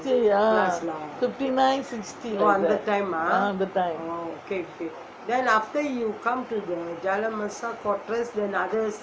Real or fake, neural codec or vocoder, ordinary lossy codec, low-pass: real; none; none; none